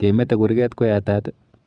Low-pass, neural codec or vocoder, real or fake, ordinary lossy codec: 9.9 kHz; vocoder, 22.05 kHz, 80 mel bands, WaveNeXt; fake; none